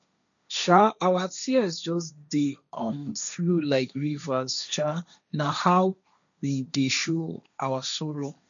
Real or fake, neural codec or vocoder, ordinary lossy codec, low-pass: fake; codec, 16 kHz, 1.1 kbps, Voila-Tokenizer; none; 7.2 kHz